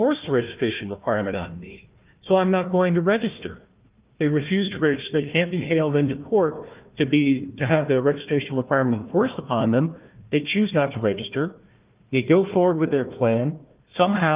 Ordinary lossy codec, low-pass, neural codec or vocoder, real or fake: Opus, 24 kbps; 3.6 kHz; codec, 16 kHz, 1 kbps, FunCodec, trained on Chinese and English, 50 frames a second; fake